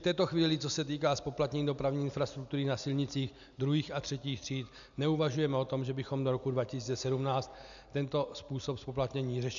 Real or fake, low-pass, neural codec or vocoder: real; 7.2 kHz; none